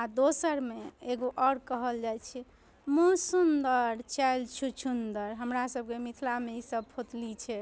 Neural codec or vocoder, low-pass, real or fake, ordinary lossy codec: none; none; real; none